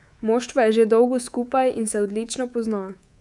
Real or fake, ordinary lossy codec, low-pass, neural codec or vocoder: fake; none; 10.8 kHz; codec, 24 kHz, 3.1 kbps, DualCodec